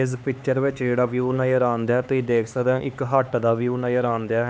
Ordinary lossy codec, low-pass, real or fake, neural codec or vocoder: none; none; fake; codec, 16 kHz, 4 kbps, X-Codec, HuBERT features, trained on LibriSpeech